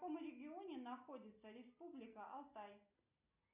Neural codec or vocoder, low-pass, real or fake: none; 3.6 kHz; real